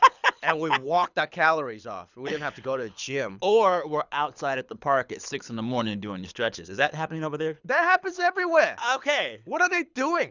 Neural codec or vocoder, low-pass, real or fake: codec, 24 kHz, 6 kbps, HILCodec; 7.2 kHz; fake